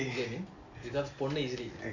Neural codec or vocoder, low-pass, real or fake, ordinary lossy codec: none; 7.2 kHz; real; none